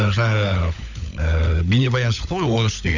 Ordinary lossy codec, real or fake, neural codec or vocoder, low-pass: none; fake; codec, 16 kHz, 16 kbps, FunCodec, trained on LibriTTS, 50 frames a second; 7.2 kHz